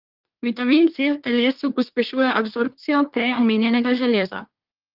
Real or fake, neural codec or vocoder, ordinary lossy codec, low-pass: fake; codec, 24 kHz, 1 kbps, SNAC; Opus, 32 kbps; 5.4 kHz